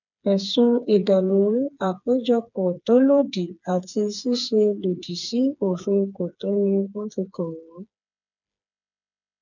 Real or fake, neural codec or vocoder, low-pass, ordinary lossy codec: fake; codec, 16 kHz, 4 kbps, FreqCodec, smaller model; 7.2 kHz; none